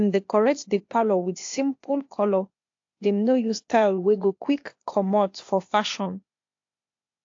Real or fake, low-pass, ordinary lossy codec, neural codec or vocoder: fake; 7.2 kHz; AAC, 48 kbps; codec, 16 kHz, 0.8 kbps, ZipCodec